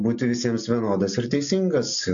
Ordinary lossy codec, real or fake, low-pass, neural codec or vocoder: AAC, 64 kbps; real; 7.2 kHz; none